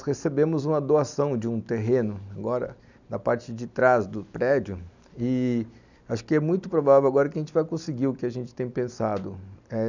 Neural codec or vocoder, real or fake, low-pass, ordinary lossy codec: none; real; 7.2 kHz; none